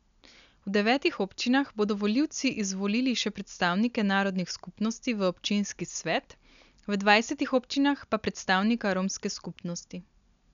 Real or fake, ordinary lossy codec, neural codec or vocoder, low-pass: real; none; none; 7.2 kHz